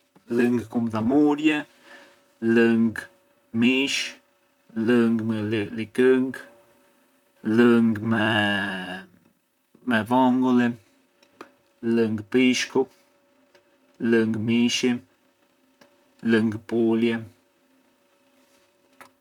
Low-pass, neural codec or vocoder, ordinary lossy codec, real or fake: 19.8 kHz; vocoder, 44.1 kHz, 128 mel bands, Pupu-Vocoder; none; fake